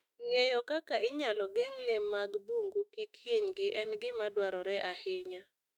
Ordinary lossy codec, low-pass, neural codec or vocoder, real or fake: none; 19.8 kHz; autoencoder, 48 kHz, 32 numbers a frame, DAC-VAE, trained on Japanese speech; fake